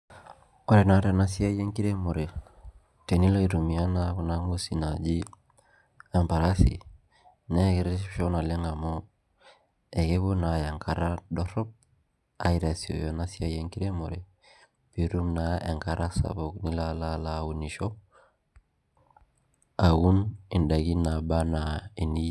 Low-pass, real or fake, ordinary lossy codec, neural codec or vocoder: none; real; none; none